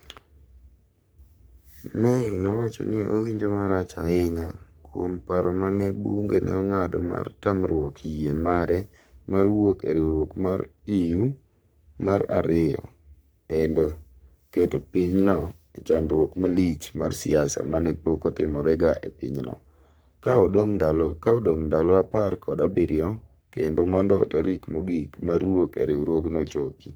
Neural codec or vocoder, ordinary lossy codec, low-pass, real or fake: codec, 44.1 kHz, 3.4 kbps, Pupu-Codec; none; none; fake